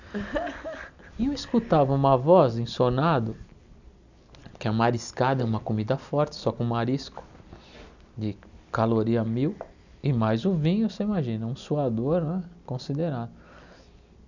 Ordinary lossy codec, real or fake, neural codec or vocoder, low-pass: none; real; none; 7.2 kHz